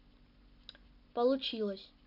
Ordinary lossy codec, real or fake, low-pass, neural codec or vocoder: none; real; 5.4 kHz; none